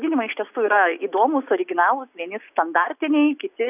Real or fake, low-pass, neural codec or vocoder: real; 3.6 kHz; none